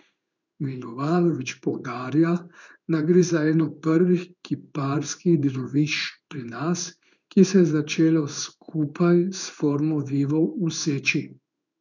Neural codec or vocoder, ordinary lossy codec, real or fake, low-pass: codec, 16 kHz in and 24 kHz out, 1 kbps, XY-Tokenizer; none; fake; 7.2 kHz